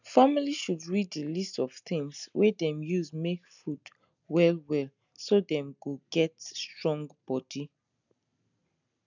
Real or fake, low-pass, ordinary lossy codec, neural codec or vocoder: real; 7.2 kHz; none; none